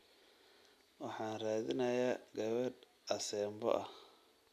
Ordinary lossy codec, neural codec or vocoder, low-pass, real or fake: MP3, 96 kbps; none; 14.4 kHz; real